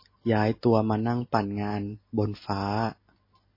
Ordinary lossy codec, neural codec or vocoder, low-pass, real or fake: MP3, 24 kbps; none; 5.4 kHz; real